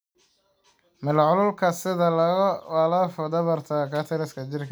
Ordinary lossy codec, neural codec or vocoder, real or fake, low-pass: none; none; real; none